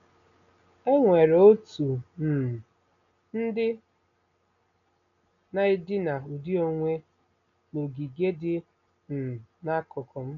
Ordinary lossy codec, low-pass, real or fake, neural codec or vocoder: none; 7.2 kHz; real; none